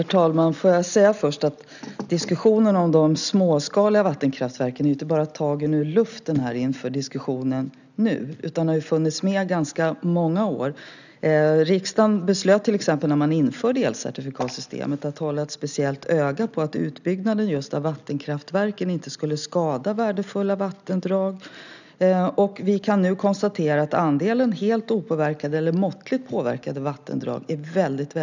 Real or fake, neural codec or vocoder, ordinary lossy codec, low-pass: real; none; none; 7.2 kHz